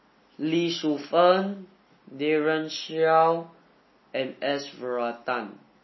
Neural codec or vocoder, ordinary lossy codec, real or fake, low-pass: none; MP3, 24 kbps; real; 7.2 kHz